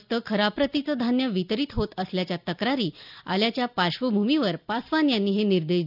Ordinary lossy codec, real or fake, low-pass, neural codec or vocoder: none; real; 5.4 kHz; none